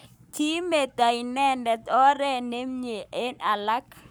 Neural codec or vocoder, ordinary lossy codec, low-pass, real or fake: codec, 44.1 kHz, 7.8 kbps, Pupu-Codec; none; none; fake